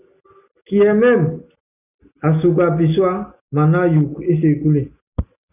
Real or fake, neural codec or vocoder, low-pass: real; none; 3.6 kHz